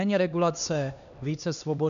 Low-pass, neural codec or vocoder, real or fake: 7.2 kHz; codec, 16 kHz, 2 kbps, X-Codec, HuBERT features, trained on LibriSpeech; fake